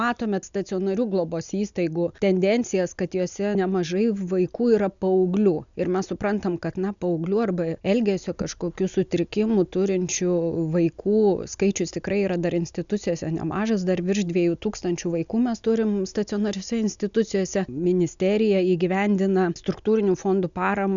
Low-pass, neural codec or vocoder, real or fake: 7.2 kHz; none; real